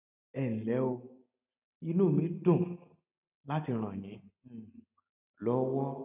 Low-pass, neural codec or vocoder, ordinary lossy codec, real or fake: 3.6 kHz; none; none; real